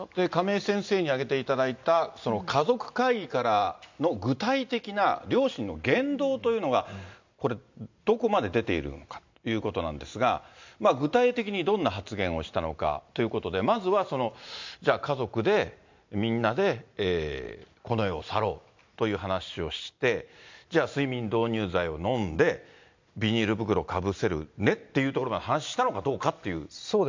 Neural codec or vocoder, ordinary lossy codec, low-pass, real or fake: none; MP3, 64 kbps; 7.2 kHz; real